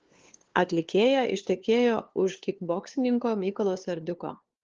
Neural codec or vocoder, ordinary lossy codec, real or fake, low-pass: codec, 16 kHz, 2 kbps, FunCodec, trained on LibriTTS, 25 frames a second; Opus, 16 kbps; fake; 7.2 kHz